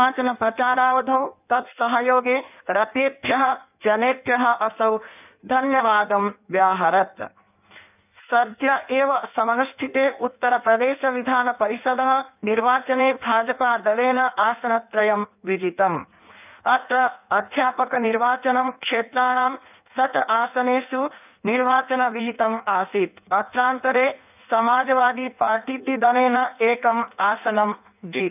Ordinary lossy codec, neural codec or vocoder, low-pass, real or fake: none; codec, 16 kHz in and 24 kHz out, 1.1 kbps, FireRedTTS-2 codec; 3.6 kHz; fake